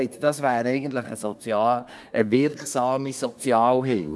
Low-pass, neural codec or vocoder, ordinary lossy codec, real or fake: none; codec, 24 kHz, 1 kbps, SNAC; none; fake